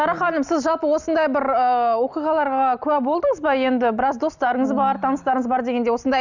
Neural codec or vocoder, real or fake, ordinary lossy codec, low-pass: none; real; none; 7.2 kHz